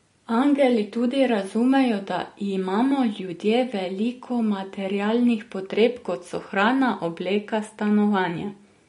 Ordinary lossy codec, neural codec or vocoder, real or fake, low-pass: MP3, 48 kbps; none; real; 10.8 kHz